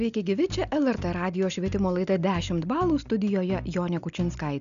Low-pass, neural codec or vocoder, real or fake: 7.2 kHz; none; real